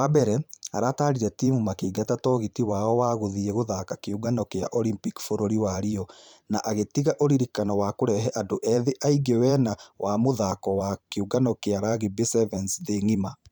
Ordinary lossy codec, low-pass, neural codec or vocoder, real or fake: none; none; vocoder, 44.1 kHz, 128 mel bands every 512 samples, BigVGAN v2; fake